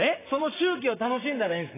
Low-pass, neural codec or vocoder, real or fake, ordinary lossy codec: 3.6 kHz; vocoder, 44.1 kHz, 128 mel bands, Pupu-Vocoder; fake; AAC, 16 kbps